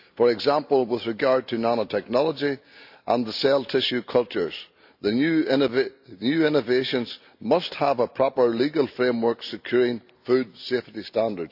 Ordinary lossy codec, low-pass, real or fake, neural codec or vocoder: AAC, 48 kbps; 5.4 kHz; real; none